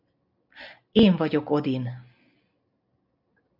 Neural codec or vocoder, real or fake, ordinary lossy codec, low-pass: none; real; MP3, 48 kbps; 5.4 kHz